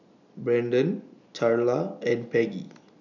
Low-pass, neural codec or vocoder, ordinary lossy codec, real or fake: 7.2 kHz; none; none; real